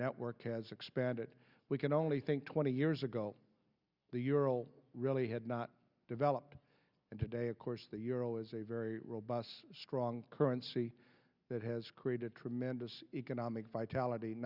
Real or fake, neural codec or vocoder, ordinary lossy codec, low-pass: real; none; Opus, 64 kbps; 5.4 kHz